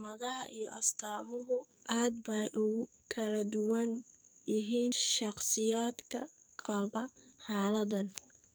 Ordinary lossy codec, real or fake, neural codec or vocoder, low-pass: none; fake; codec, 44.1 kHz, 2.6 kbps, SNAC; none